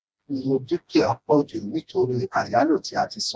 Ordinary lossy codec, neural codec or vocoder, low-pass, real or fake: none; codec, 16 kHz, 1 kbps, FreqCodec, smaller model; none; fake